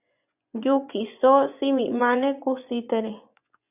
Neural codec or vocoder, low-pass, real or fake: vocoder, 24 kHz, 100 mel bands, Vocos; 3.6 kHz; fake